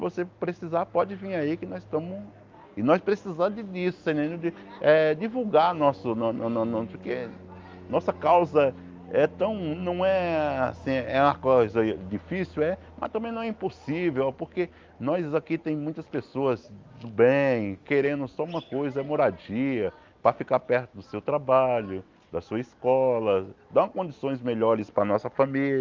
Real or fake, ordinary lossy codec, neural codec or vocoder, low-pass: real; Opus, 32 kbps; none; 7.2 kHz